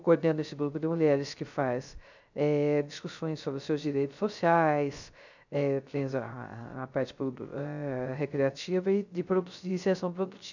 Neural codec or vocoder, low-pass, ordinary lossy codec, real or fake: codec, 16 kHz, 0.3 kbps, FocalCodec; 7.2 kHz; none; fake